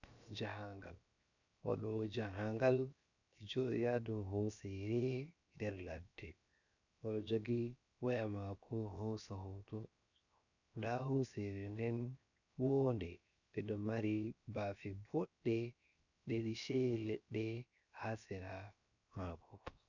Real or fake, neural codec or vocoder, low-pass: fake; codec, 16 kHz, 0.7 kbps, FocalCodec; 7.2 kHz